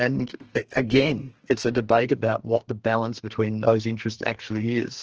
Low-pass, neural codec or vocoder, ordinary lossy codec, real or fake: 7.2 kHz; codec, 32 kHz, 1.9 kbps, SNAC; Opus, 16 kbps; fake